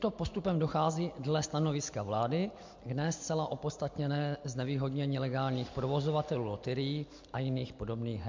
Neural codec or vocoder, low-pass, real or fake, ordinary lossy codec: none; 7.2 kHz; real; MP3, 48 kbps